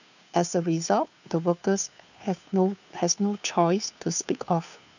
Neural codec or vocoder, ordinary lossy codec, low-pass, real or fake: codec, 16 kHz, 2 kbps, FunCodec, trained on Chinese and English, 25 frames a second; none; 7.2 kHz; fake